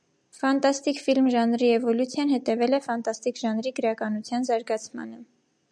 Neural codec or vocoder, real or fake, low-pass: none; real; 9.9 kHz